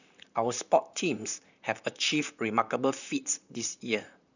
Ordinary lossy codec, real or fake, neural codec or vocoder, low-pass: none; fake; vocoder, 44.1 kHz, 128 mel bands, Pupu-Vocoder; 7.2 kHz